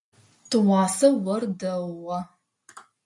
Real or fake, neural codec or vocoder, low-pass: real; none; 10.8 kHz